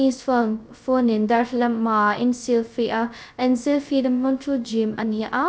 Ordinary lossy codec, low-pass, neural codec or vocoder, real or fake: none; none; codec, 16 kHz, 0.2 kbps, FocalCodec; fake